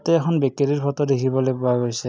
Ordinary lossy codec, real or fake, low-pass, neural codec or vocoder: none; real; none; none